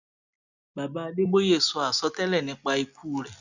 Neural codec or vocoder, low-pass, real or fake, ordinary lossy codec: none; 7.2 kHz; real; none